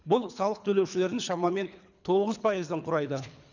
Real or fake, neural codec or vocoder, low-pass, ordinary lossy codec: fake; codec, 24 kHz, 3 kbps, HILCodec; 7.2 kHz; none